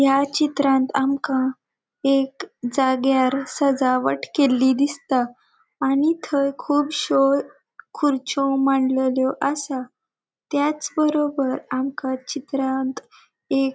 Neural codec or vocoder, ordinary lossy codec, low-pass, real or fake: none; none; none; real